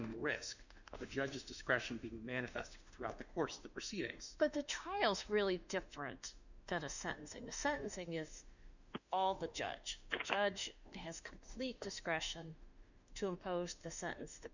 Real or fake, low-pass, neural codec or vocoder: fake; 7.2 kHz; autoencoder, 48 kHz, 32 numbers a frame, DAC-VAE, trained on Japanese speech